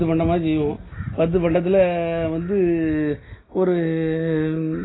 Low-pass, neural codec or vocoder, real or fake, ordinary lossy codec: 7.2 kHz; none; real; AAC, 16 kbps